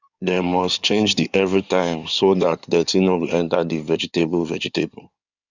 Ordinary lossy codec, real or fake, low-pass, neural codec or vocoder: none; fake; 7.2 kHz; codec, 16 kHz in and 24 kHz out, 2.2 kbps, FireRedTTS-2 codec